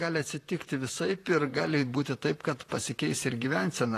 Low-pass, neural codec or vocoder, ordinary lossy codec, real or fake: 14.4 kHz; vocoder, 44.1 kHz, 128 mel bands, Pupu-Vocoder; AAC, 48 kbps; fake